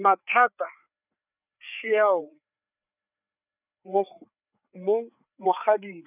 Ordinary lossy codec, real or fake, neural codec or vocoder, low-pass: none; fake; codec, 16 kHz, 4 kbps, FreqCodec, larger model; 3.6 kHz